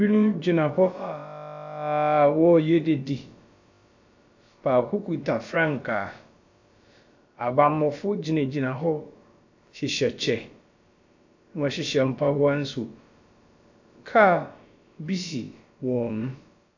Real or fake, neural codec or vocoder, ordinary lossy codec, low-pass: fake; codec, 16 kHz, about 1 kbps, DyCAST, with the encoder's durations; AAC, 48 kbps; 7.2 kHz